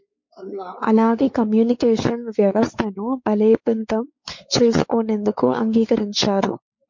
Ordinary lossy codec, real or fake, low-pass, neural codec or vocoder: MP3, 48 kbps; fake; 7.2 kHz; codec, 16 kHz, 4 kbps, X-Codec, WavLM features, trained on Multilingual LibriSpeech